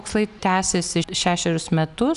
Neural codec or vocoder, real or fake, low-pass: none; real; 10.8 kHz